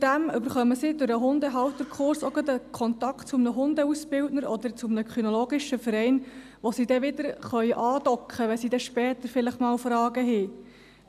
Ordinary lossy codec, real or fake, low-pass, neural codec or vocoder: none; real; 14.4 kHz; none